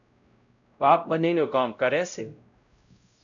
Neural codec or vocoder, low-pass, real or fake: codec, 16 kHz, 0.5 kbps, X-Codec, WavLM features, trained on Multilingual LibriSpeech; 7.2 kHz; fake